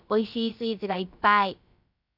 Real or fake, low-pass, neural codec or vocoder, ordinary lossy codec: fake; 5.4 kHz; codec, 16 kHz, about 1 kbps, DyCAST, with the encoder's durations; none